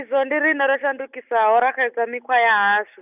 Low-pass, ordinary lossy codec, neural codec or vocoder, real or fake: 3.6 kHz; none; none; real